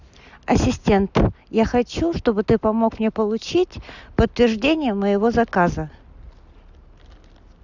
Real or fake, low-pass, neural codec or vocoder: real; 7.2 kHz; none